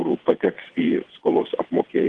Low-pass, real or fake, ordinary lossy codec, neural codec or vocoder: 9.9 kHz; fake; Opus, 16 kbps; vocoder, 22.05 kHz, 80 mel bands, WaveNeXt